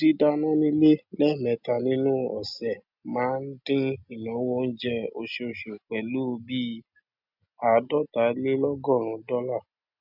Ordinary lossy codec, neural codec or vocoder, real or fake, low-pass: none; none; real; 5.4 kHz